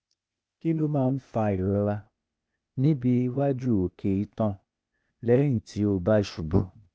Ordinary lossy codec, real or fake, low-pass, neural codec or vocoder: none; fake; none; codec, 16 kHz, 0.8 kbps, ZipCodec